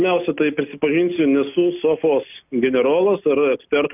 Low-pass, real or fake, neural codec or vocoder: 3.6 kHz; real; none